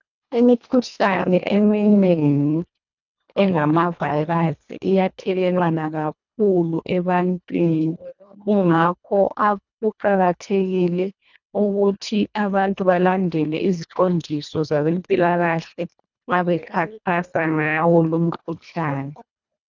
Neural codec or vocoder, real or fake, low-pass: codec, 24 kHz, 1.5 kbps, HILCodec; fake; 7.2 kHz